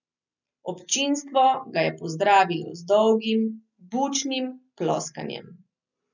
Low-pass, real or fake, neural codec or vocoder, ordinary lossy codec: 7.2 kHz; real; none; none